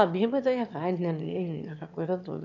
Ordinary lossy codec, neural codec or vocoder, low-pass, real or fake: none; autoencoder, 22.05 kHz, a latent of 192 numbers a frame, VITS, trained on one speaker; 7.2 kHz; fake